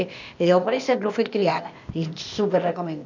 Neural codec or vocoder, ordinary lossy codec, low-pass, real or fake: codec, 16 kHz, 0.8 kbps, ZipCodec; none; 7.2 kHz; fake